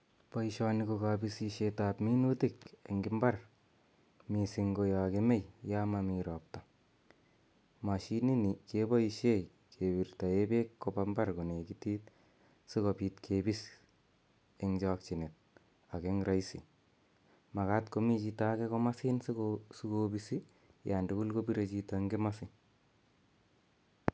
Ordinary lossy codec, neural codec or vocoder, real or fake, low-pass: none; none; real; none